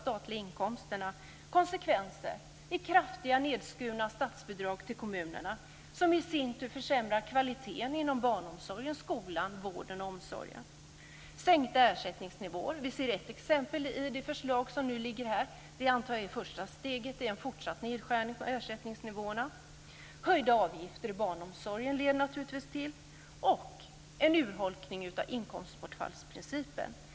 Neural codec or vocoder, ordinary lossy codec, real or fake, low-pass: none; none; real; none